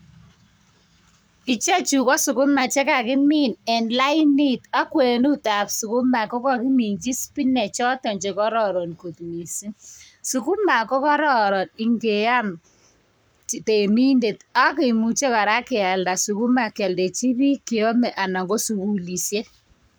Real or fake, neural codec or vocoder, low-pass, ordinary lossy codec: fake; codec, 44.1 kHz, 7.8 kbps, Pupu-Codec; none; none